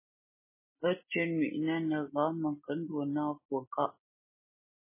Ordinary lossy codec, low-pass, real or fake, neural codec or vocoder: MP3, 16 kbps; 3.6 kHz; real; none